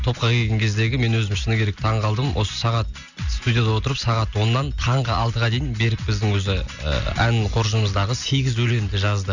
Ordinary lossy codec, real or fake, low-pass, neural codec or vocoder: none; real; 7.2 kHz; none